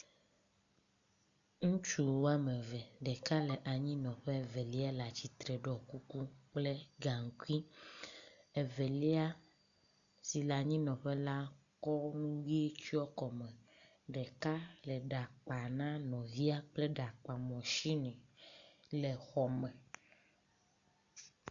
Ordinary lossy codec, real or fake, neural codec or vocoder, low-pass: MP3, 96 kbps; real; none; 7.2 kHz